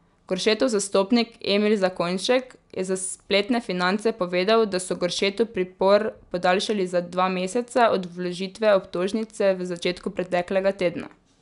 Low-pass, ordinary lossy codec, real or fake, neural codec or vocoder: 10.8 kHz; none; real; none